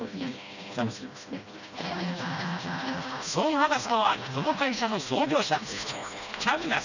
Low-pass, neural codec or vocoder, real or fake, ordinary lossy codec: 7.2 kHz; codec, 16 kHz, 1 kbps, FreqCodec, smaller model; fake; none